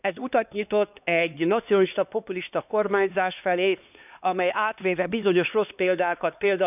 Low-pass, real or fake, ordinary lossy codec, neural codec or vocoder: 3.6 kHz; fake; none; codec, 16 kHz, 2 kbps, X-Codec, HuBERT features, trained on LibriSpeech